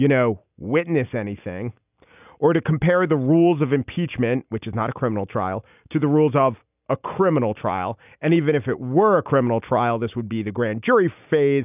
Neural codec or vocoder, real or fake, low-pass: none; real; 3.6 kHz